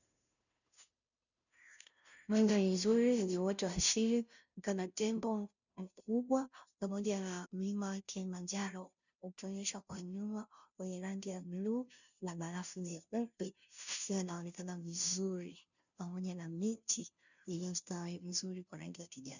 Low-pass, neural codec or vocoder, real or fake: 7.2 kHz; codec, 16 kHz, 0.5 kbps, FunCodec, trained on Chinese and English, 25 frames a second; fake